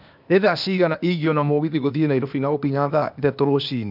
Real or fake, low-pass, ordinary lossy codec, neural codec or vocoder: fake; 5.4 kHz; none; codec, 16 kHz, 0.8 kbps, ZipCodec